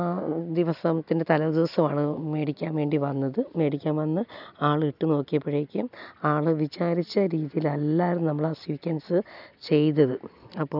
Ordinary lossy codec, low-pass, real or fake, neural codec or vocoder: none; 5.4 kHz; real; none